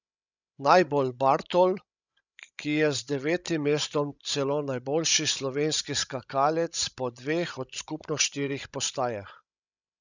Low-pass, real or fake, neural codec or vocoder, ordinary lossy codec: 7.2 kHz; fake; codec, 16 kHz, 16 kbps, FreqCodec, larger model; none